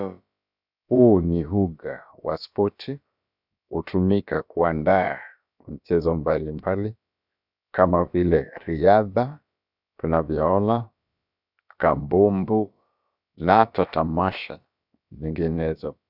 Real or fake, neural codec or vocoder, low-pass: fake; codec, 16 kHz, about 1 kbps, DyCAST, with the encoder's durations; 5.4 kHz